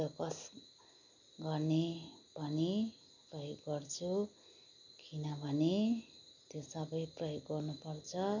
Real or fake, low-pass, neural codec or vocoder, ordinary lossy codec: real; 7.2 kHz; none; none